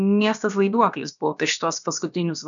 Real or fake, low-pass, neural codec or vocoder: fake; 7.2 kHz; codec, 16 kHz, about 1 kbps, DyCAST, with the encoder's durations